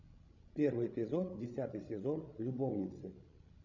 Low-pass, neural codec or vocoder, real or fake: 7.2 kHz; codec, 16 kHz, 16 kbps, FreqCodec, larger model; fake